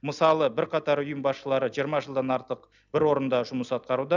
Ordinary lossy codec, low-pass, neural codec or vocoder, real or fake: none; 7.2 kHz; none; real